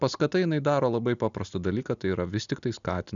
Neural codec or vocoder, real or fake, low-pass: none; real; 7.2 kHz